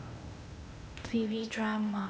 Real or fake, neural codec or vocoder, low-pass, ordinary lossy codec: fake; codec, 16 kHz, 0.8 kbps, ZipCodec; none; none